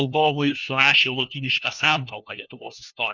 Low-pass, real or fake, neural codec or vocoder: 7.2 kHz; fake; codec, 16 kHz, 1 kbps, FunCodec, trained on LibriTTS, 50 frames a second